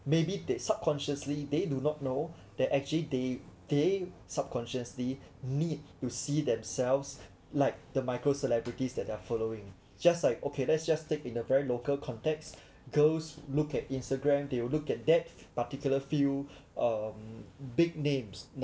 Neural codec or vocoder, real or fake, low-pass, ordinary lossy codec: none; real; none; none